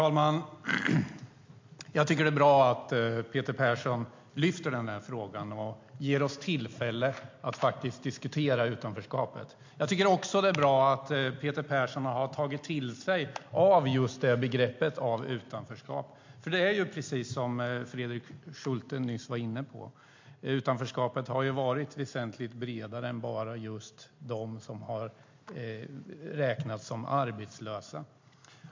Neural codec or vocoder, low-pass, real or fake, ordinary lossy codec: none; 7.2 kHz; real; MP3, 48 kbps